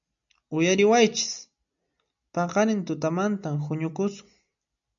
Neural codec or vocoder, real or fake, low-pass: none; real; 7.2 kHz